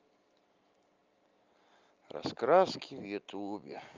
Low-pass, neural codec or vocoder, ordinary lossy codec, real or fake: 7.2 kHz; none; Opus, 24 kbps; real